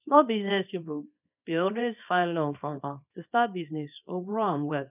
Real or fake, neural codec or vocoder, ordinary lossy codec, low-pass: fake; codec, 24 kHz, 0.9 kbps, WavTokenizer, small release; none; 3.6 kHz